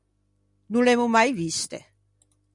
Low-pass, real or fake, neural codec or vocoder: 10.8 kHz; real; none